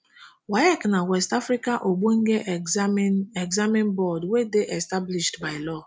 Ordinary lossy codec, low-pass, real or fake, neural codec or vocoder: none; none; real; none